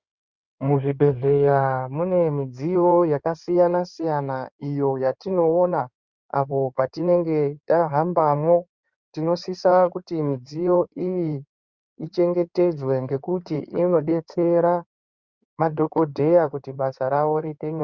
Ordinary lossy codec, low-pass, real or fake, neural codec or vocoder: Opus, 64 kbps; 7.2 kHz; fake; codec, 16 kHz in and 24 kHz out, 2.2 kbps, FireRedTTS-2 codec